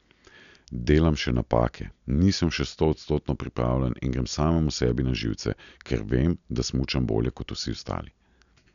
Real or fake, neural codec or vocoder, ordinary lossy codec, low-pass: real; none; none; 7.2 kHz